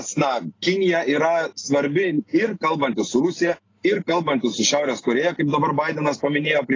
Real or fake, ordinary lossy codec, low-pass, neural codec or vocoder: real; AAC, 32 kbps; 7.2 kHz; none